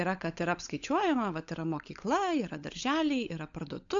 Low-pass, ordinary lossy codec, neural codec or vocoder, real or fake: 7.2 kHz; AAC, 64 kbps; codec, 16 kHz, 16 kbps, FunCodec, trained on LibriTTS, 50 frames a second; fake